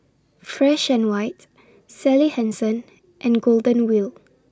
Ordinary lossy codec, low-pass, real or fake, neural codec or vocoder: none; none; real; none